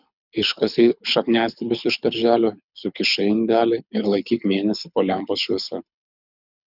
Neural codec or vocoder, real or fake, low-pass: codec, 24 kHz, 6 kbps, HILCodec; fake; 5.4 kHz